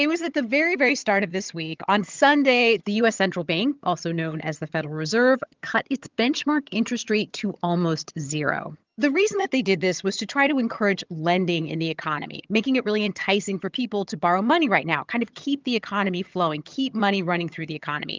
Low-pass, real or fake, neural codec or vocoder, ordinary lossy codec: 7.2 kHz; fake; vocoder, 22.05 kHz, 80 mel bands, HiFi-GAN; Opus, 32 kbps